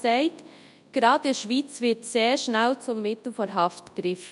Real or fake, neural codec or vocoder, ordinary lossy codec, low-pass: fake; codec, 24 kHz, 0.9 kbps, WavTokenizer, large speech release; none; 10.8 kHz